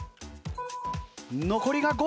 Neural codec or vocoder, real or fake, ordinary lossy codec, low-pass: none; real; none; none